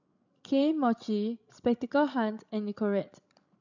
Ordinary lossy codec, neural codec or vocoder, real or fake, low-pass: none; codec, 16 kHz, 16 kbps, FreqCodec, larger model; fake; 7.2 kHz